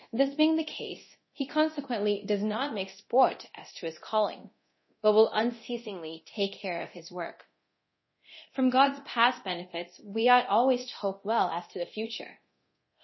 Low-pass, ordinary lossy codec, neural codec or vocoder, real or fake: 7.2 kHz; MP3, 24 kbps; codec, 24 kHz, 0.9 kbps, DualCodec; fake